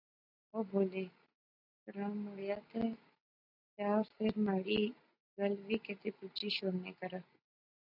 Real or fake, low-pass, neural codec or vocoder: real; 5.4 kHz; none